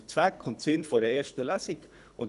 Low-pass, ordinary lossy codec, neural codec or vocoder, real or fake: 10.8 kHz; none; codec, 24 kHz, 3 kbps, HILCodec; fake